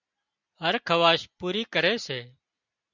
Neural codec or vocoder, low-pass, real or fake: none; 7.2 kHz; real